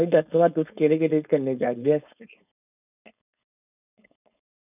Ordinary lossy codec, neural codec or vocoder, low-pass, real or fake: none; codec, 16 kHz, 4.8 kbps, FACodec; 3.6 kHz; fake